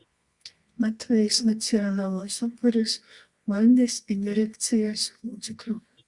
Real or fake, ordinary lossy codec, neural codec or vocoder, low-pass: fake; Opus, 64 kbps; codec, 24 kHz, 0.9 kbps, WavTokenizer, medium music audio release; 10.8 kHz